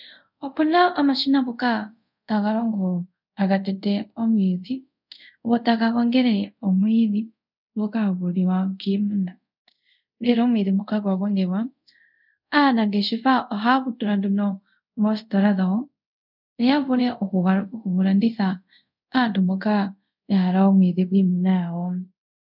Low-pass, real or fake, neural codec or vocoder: 5.4 kHz; fake; codec, 24 kHz, 0.5 kbps, DualCodec